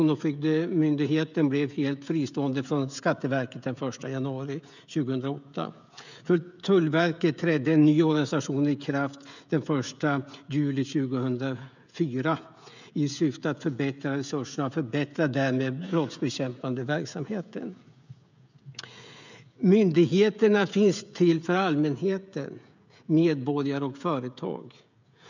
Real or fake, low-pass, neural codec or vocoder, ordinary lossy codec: fake; 7.2 kHz; codec, 16 kHz, 16 kbps, FreqCodec, smaller model; none